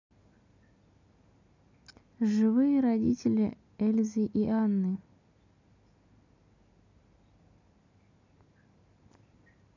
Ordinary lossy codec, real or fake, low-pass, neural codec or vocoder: none; real; 7.2 kHz; none